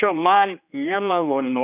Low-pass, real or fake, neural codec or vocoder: 3.6 kHz; fake; codec, 16 kHz, 1 kbps, X-Codec, HuBERT features, trained on general audio